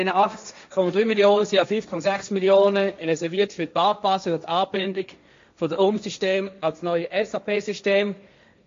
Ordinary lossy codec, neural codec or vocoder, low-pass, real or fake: MP3, 48 kbps; codec, 16 kHz, 1.1 kbps, Voila-Tokenizer; 7.2 kHz; fake